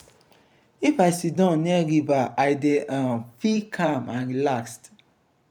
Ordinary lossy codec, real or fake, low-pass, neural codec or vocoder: none; fake; 19.8 kHz; vocoder, 44.1 kHz, 128 mel bands every 512 samples, BigVGAN v2